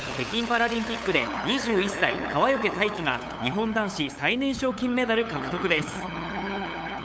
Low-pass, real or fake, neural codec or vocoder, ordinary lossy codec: none; fake; codec, 16 kHz, 8 kbps, FunCodec, trained on LibriTTS, 25 frames a second; none